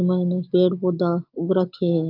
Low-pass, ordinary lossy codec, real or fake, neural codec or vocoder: 5.4 kHz; Opus, 32 kbps; real; none